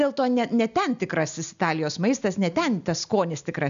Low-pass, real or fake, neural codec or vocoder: 7.2 kHz; real; none